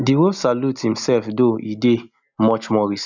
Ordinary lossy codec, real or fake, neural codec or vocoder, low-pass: none; real; none; 7.2 kHz